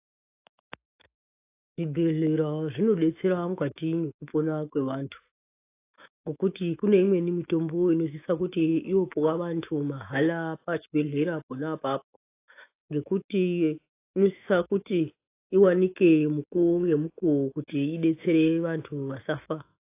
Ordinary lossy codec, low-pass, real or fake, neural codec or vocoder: AAC, 24 kbps; 3.6 kHz; fake; autoencoder, 48 kHz, 128 numbers a frame, DAC-VAE, trained on Japanese speech